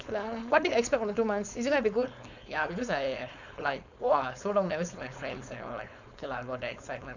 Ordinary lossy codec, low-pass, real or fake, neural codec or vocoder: none; 7.2 kHz; fake; codec, 16 kHz, 4.8 kbps, FACodec